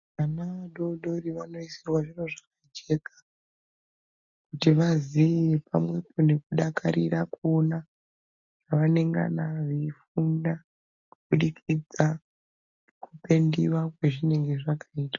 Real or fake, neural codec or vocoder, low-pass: real; none; 7.2 kHz